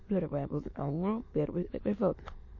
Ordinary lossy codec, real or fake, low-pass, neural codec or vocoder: MP3, 32 kbps; fake; 7.2 kHz; autoencoder, 22.05 kHz, a latent of 192 numbers a frame, VITS, trained on many speakers